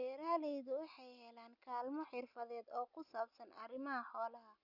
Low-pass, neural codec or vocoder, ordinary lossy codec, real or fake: 5.4 kHz; none; none; real